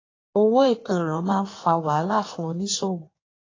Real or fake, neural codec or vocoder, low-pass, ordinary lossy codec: fake; codec, 16 kHz in and 24 kHz out, 1.1 kbps, FireRedTTS-2 codec; 7.2 kHz; AAC, 32 kbps